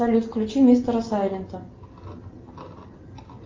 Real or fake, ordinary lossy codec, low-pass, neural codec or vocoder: real; Opus, 24 kbps; 7.2 kHz; none